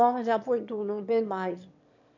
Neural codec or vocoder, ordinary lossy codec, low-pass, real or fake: autoencoder, 22.05 kHz, a latent of 192 numbers a frame, VITS, trained on one speaker; none; 7.2 kHz; fake